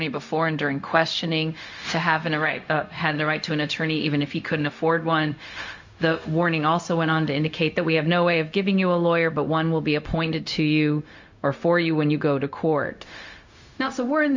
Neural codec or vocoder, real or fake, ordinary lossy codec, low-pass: codec, 16 kHz, 0.4 kbps, LongCat-Audio-Codec; fake; MP3, 48 kbps; 7.2 kHz